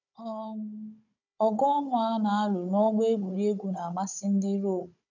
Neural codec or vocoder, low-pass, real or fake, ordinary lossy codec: codec, 16 kHz, 16 kbps, FunCodec, trained on Chinese and English, 50 frames a second; 7.2 kHz; fake; none